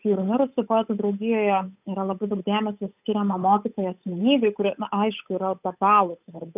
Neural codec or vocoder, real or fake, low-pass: codec, 16 kHz, 8 kbps, FunCodec, trained on Chinese and English, 25 frames a second; fake; 3.6 kHz